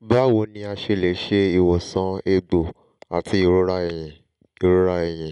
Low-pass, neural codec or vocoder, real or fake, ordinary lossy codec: 10.8 kHz; none; real; none